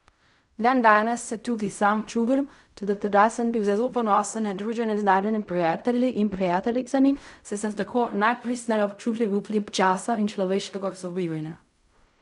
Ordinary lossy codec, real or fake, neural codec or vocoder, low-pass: none; fake; codec, 16 kHz in and 24 kHz out, 0.4 kbps, LongCat-Audio-Codec, fine tuned four codebook decoder; 10.8 kHz